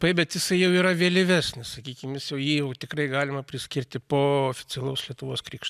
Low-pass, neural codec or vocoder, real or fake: 14.4 kHz; none; real